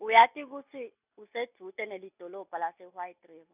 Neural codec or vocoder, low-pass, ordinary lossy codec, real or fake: none; 3.6 kHz; none; real